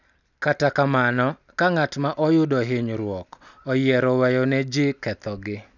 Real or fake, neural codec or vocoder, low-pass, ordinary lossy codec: real; none; 7.2 kHz; none